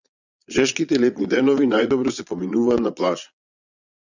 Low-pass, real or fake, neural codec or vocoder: 7.2 kHz; fake; vocoder, 22.05 kHz, 80 mel bands, Vocos